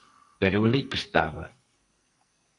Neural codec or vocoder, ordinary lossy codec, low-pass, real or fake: codec, 44.1 kHz, 2.6 kbps, SNAC; AAC, 48 kbps; 10.8 kHz; fake